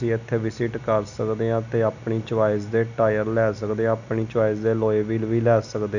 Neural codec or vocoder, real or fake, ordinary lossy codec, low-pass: none; real; none; 7.2 kHz